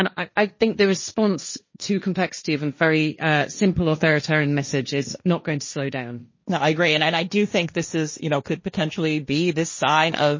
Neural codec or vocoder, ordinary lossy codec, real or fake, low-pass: codec, 16 kHz, 1.1 kbps, Voila-Tokenizer; MP3, 32 kbps; fake; 7.2 kHz